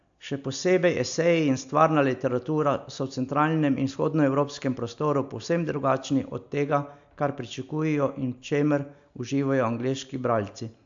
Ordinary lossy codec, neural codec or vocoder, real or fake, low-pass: AAC, 64 kbps; none; real; 7.2 kHz